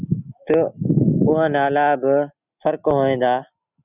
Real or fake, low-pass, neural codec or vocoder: real; 3.6 kHz; none